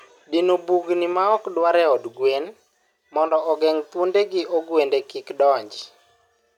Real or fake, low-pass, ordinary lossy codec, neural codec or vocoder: real; 19.8 kHz; none; none